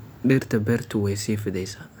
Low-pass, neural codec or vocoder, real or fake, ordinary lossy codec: none; none; real; none